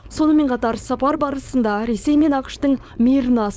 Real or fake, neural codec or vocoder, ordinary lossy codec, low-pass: fake; codec, 16 kHz, 4.8 kbps, FACodec; none; none